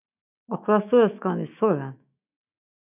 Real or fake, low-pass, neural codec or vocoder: fake; 3.6 kHz; autoencoder, 48 kHz, 128 numbers a frame, DAC-VAE, trained on Japanese speech